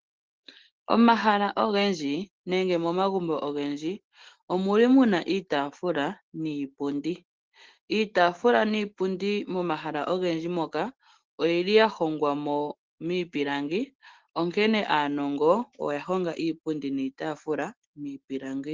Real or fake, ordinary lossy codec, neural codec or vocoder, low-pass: real; Opus, 16 kbps; none; 7.2 kHz